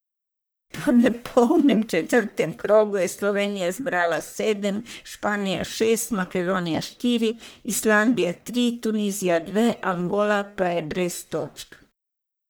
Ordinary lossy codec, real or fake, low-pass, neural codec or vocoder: none; fake; none; codec, 44.1 kHz, 1.7 kbps, Pupu-Codec